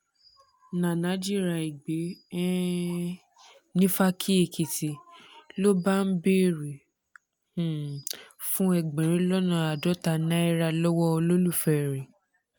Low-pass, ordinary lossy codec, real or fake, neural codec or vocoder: none; none; real; none